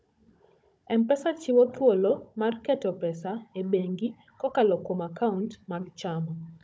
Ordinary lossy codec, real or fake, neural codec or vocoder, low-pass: none; fake; codec, 16 kHz, 16 kbps, FunCodec, trained on Chinese and English, 50 frames a second; none